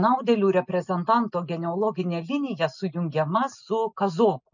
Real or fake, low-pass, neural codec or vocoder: real; 7.2 kHz; none